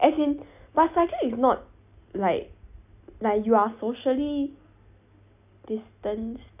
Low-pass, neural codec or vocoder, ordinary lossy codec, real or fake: 3.6 kHz; none; none; real